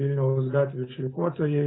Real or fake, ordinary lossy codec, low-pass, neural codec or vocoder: real; AAC, 16 kbps; 7.2 kHz; none